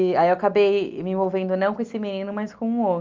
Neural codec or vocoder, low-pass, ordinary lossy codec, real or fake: none; 7.2 kHz; Opus, 32 kbps; real